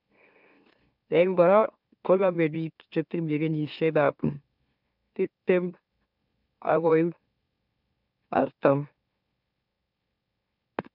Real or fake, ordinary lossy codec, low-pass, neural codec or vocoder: fake; none; 5.4 kHz; autoencoder, 44.1 kHz, a latent of 192 numbers a frame, MeloTTS